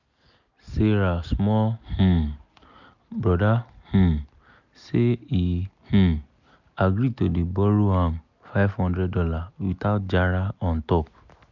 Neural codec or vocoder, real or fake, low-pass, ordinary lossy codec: none; real; 7.2 kHz; none